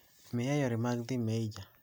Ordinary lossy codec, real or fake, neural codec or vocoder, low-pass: none; real; none; none